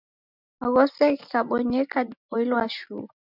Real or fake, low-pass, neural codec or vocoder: real; 5.4 kHz; none